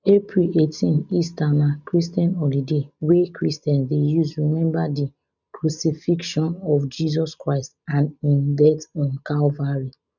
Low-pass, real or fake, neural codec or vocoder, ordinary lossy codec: 7.2 kHz; real; none; none